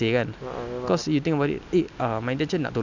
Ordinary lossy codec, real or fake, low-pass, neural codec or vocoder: none; real; 7.2 kHz; none